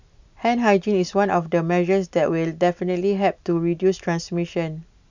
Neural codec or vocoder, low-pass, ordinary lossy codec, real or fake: none; 7.2 kHz; none; real